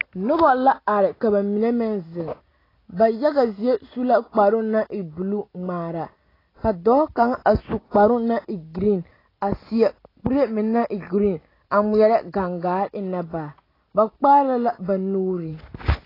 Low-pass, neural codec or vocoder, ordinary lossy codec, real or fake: 5.4 kHz; none; AAC, 24 kbps; real